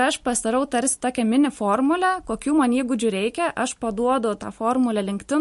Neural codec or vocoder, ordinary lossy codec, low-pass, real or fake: none; MP3, 48 kbps; 14.4 kHz; real